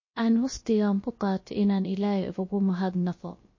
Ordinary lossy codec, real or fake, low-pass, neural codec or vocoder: MP3, 32 kbps; fake; 7.2 kHz; codec, 16 kHz, 0.3 kbps, FocalCodec